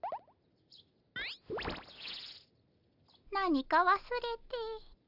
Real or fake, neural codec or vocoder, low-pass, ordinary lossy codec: real; none; 5.4 kHz; none